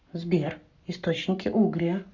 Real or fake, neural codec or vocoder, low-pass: fake; codec, 44.1 kHz, 7.8 kbps, Pupu-Codec; 7.2 kHz